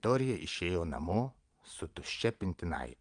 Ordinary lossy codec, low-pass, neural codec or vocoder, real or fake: Opus, 32 kbps; 9.9 kHz; vocoder, 22.05 kHz, 80 mel bands, Vocos; fake